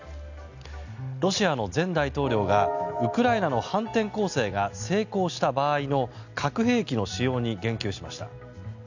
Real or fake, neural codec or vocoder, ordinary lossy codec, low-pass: real; none; none; 7.2 kHz